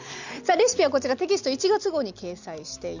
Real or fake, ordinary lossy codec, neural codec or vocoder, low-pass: real; none; none; 7.2 kHz